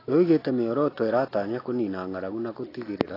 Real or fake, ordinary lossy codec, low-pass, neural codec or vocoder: real; AAC, 24 kbps; 5.4 kHz; none